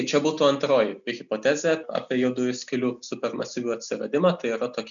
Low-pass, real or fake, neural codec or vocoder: 7.2 kHz; real; none